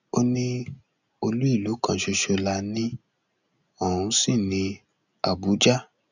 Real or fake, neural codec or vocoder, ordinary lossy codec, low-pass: real; none; none; 7.2 kHz